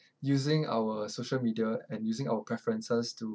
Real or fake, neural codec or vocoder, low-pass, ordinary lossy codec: real; none; none; none